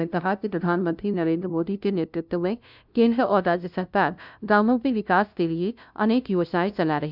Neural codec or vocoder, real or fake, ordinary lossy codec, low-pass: codec, 16 kHz, 0.5 kbps, FunCodec, trained on LibriTTS, 25 frames a second; fake; none; 5.4 kHz